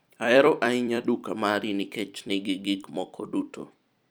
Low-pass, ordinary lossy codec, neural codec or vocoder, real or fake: none; none; vocoder, 44.1 kHz, 128 mel bands every 256 samples, BigVGAN v2; fake